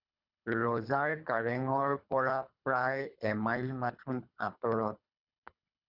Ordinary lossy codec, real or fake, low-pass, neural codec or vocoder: Opus, 64 kbps; fake; 5.4 kHz; codec, 24 kHz, 3 kbps, HILCodec